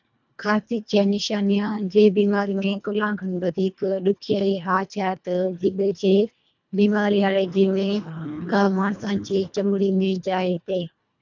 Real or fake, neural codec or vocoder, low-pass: fake; codec, 24 kHz, 1.5 kbps, HILCodec; 7.2 kHz